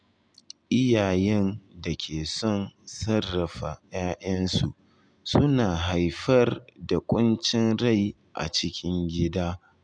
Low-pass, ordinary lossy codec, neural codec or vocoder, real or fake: 9.9 kHz; none; none; real